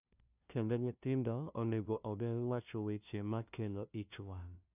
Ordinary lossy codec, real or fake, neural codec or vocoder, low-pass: none; fake; codec, 16 kHz, 0.5 kbps, FunCodec, trained on LibriTTS, 25 frames a second; 3.6 kHz